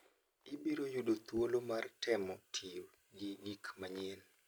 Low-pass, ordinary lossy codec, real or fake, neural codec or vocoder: none; none; real; none